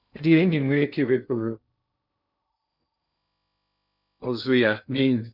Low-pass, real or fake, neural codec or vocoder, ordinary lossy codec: 5.4 kHz; fake; codec, 16 kHz in and 24 kHz out, 0.6 kbps, FocalCodec, streaming, 2048 codes; none